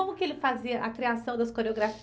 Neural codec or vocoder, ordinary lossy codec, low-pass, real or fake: none; none; none; real